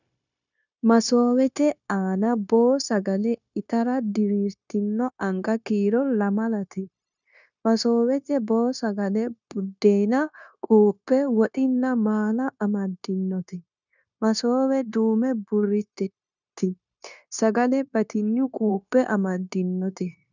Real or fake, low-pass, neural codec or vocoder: fake; 7.2 kHz; codec, 16 kHz, 0.9 kbps, LongCat-Audio-Codec